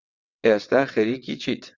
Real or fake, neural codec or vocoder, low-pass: fake; vocoder, 22.05 kHz, 80 mel bands, WaveNeXt; 7.2 kHz